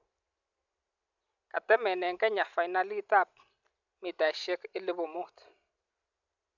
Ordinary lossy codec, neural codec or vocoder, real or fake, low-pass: none; none; real; 7.2 kHz